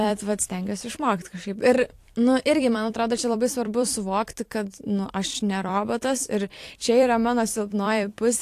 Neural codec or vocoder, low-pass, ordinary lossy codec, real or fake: vocoder, 44.1 kHz, 128 mel bands every 256 samples, BigVGAN v2; 14.4 kHz; AAC, 64 kbps; fake